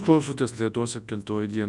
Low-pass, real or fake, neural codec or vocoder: 10.8 kHz; fake; codec, 24 kHz, 0.9 kbps, WavTokenizer, large speech release